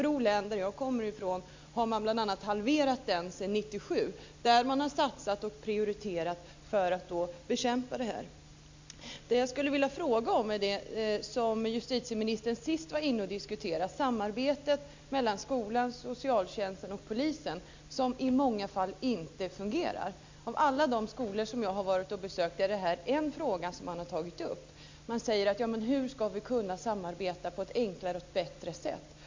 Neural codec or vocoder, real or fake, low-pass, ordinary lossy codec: none; real; 7.2 kHz; MP3, 64 kbps